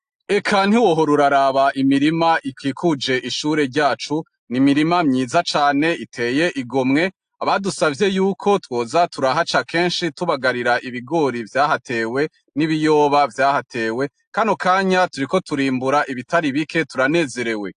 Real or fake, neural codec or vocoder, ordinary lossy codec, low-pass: real; none; AAC, 64 kbps; 9.9 kHz